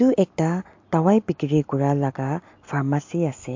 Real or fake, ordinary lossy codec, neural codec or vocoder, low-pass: real; MP3, 48 kbps; none; 7.2 kHz